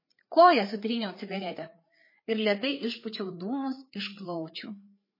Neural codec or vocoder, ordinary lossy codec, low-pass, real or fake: codec, 16 kHz, 4 kbps, FreqCodec, larger model; MP3, 24 kbps; 5.4 kHz; fake